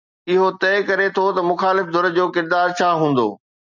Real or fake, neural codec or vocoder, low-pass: real; none; 7.2 kHz